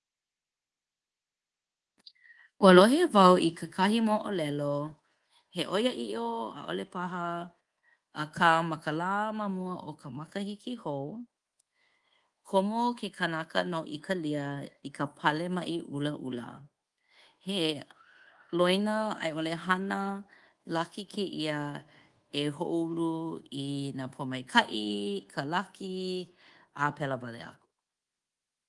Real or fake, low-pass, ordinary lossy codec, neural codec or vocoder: fake; 10.8 kHz; Opus, 24 kbps; codec, 24 kHz, 1.2 kbps, DualCodec